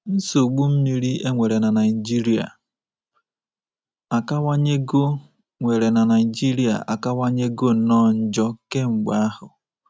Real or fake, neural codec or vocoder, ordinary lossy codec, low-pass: real; none; none; none